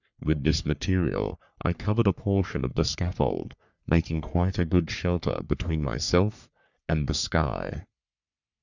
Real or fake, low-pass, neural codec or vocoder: fake; 7.2 kHz; codec, 44.1 kHz, 3.4 kbps, Pupu-Codec